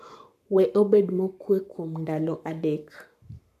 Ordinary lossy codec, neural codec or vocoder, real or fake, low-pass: none; codec, 44.1 kHz, 7.8 kbps, Pupu-Codec; fake; 14.4 kHz